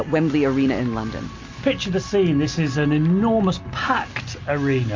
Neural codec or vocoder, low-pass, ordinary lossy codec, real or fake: none; 7.2 kHz; MP3, 48 kbps; real